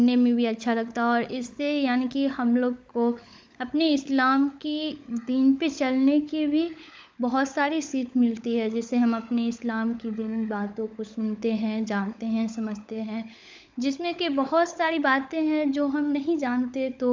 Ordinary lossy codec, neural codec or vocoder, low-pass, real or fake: none; codec, 16 kHz, 16 kbps, FunCodec, trained on LibriTTS, 50 frames a second; none; fake